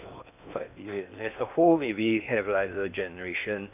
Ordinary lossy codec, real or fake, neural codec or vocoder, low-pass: none; fake; codec, 16 kHz in and 24 kHz out, 0.8 kbps, FocalCodec, streaming, 65536 codes; 3.6 kHz